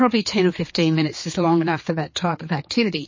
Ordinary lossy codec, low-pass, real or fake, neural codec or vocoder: MP3, 32 kbps; 7.2 kHz; fake; codec, 16 kHz, 4 kbps, X-Codec, HuBERT features, trained on general audio